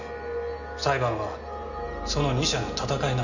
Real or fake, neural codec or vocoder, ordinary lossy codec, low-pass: real; none; none; 7.2 kHz